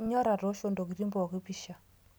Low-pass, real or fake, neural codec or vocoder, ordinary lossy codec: none; real; none; none